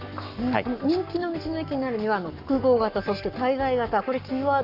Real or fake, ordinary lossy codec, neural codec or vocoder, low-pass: fake; none; codec, 44.1 kHz, 7.8 kbps, Pupu-Codec; 5.4 kHz